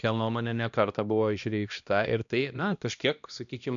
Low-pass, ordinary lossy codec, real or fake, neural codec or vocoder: 7.2 kHz; MP3, 96 kbps; fake; codec, 16 kHz, 1 kbps, X-Codec, HuBERT features, trained on balanced general audio